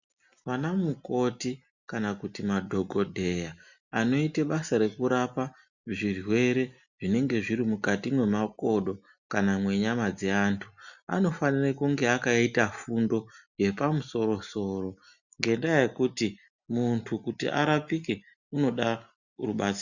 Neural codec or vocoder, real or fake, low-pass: none; real; 7.2 kHz